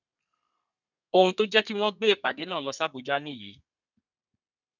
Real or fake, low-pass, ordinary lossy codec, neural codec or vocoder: fake; 7.2 kHz; none; codec, 32 kHz, 1.9 kbps, SNAC